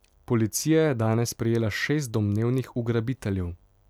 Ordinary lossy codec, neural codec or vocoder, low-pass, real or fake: none; none; 19.8 kHz; real